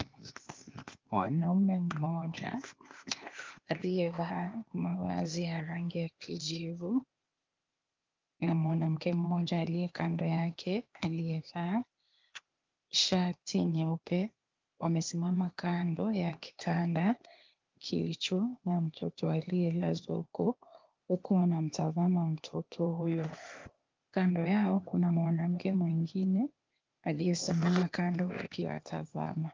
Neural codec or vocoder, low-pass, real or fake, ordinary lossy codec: codec, 16 kHz, 0.8 kbps, ZipCodec; 7.2 kHz; fake; Opus, 32 kbps